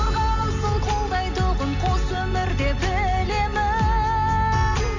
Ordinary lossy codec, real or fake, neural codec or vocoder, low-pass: none; real; none; 7.2 kHz